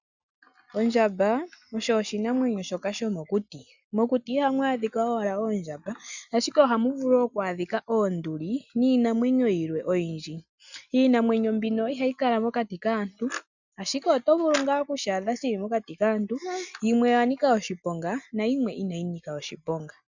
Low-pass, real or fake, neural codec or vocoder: 7.2 kHz; real; none